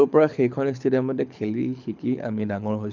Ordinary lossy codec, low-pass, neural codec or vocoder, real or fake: none; 7.2 kHz; codec, 24 kHz, 6 kbps, HILCodec; fake